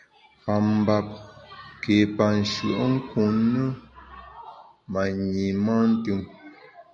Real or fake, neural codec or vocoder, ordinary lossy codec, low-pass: real; none; MP3, 96 kbps; 9.9 kHz